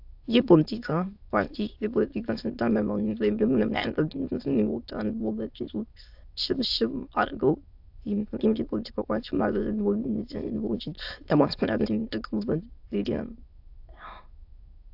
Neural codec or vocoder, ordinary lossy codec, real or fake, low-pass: autoencoder, 22.05 kHz, a latent of 192 numbers a frame, VITS, trained on many speakers; AAC, 48 kbps; fake; 5.4 kHz